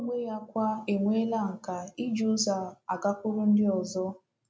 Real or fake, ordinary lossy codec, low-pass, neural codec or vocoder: real; none; none; none